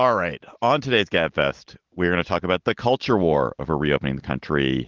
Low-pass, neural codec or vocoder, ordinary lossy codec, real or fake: 7.2 kHz; none; Opus, 16 kbps; real